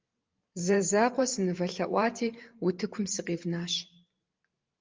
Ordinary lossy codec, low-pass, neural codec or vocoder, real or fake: Opus, 32 kbps; 7.2 kHz; vocoder, 44.1 kHz, 128 mel bands every 512 samples, BigVGAN v2; fake